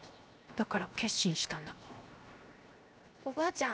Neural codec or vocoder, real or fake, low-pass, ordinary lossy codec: codec, 16 kHz, 0.7 kbps, FocalCodec; fake; none; none